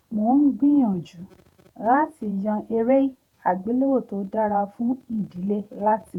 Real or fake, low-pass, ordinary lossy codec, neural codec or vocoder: fake; 19.8 kHz; none; vocoder, 48 kHz, 128 mel bands, Vocos